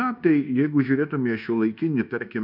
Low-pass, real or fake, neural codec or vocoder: 5.4 kHz; fake; codec, 24 kHz, 1.2 kbps, DualCodec